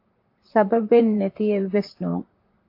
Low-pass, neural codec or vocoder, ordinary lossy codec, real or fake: 5.4 kHz; vocoder, 44.1 kHz, 128 mel bands, Pupu-Vocoder; AAC, 32 kbps; fake